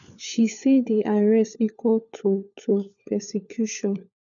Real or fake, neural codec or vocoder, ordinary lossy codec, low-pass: fake; codec, 16 kHz, 4 kbps, FunCodec, trained on LibriTTS, 50 frames a second; none; 7.2 kHz